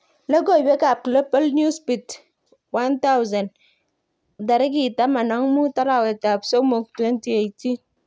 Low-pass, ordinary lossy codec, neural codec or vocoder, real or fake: none; none; none; real